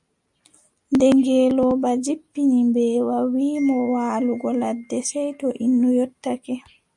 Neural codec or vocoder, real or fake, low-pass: none; real; 10.8 kHz